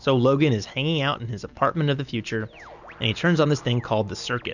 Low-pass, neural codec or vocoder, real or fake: 7.2 kHz; none; real